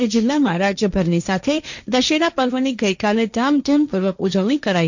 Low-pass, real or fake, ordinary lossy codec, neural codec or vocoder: 7.2 kHz; fake; none; codec, 16 kHz, 1.1 kbps, Voila-Tokenizer